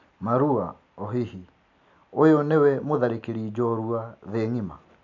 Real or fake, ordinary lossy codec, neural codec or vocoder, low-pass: real; none; none; 7.2 kHz